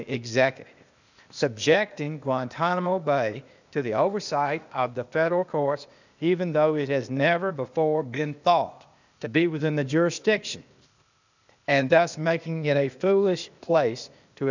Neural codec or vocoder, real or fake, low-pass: codec, 16 kHz, 0.8 kbps, ZipCodec; fake; 7.2 kHz